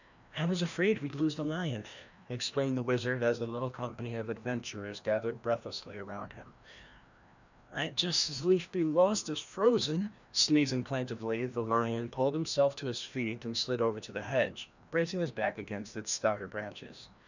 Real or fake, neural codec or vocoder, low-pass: fake; codec, 16 kHz, 1 kbps, FreqCodec, larger model; 7.2 kHz